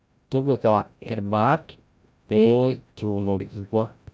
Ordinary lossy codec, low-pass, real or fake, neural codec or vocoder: none; none; fake; codec, 16 kHz, 0.5 kbps, FreqCodec, larger model